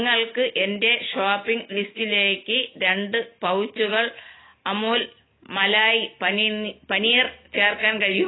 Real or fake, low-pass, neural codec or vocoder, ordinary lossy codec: real; 7.2 kHz; none; AAC, 16 kbps